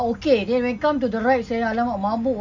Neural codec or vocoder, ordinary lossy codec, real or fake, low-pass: none; none; real; none